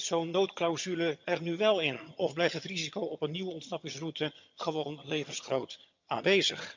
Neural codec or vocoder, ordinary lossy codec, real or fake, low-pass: vocoder, 22.05 kHz, 80 mel bands, HiFi-GAN; MP3, 64 kbps; fake; 7.2 kHz